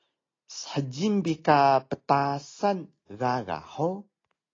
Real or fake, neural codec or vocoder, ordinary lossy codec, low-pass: real; none; AAC, 32 kbps; 7.2 kHz